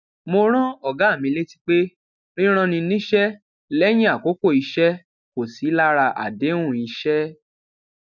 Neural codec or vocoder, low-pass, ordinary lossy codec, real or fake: none; 7.2 kHz; none; real